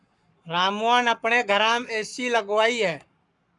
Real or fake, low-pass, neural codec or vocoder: fake; 10.8 kHz; codec, 44.1 kHz, 7.8 kbps, Pupu-Codec